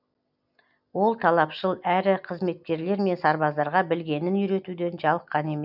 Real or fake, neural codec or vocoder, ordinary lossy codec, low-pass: real; none; none; 5.4 kHz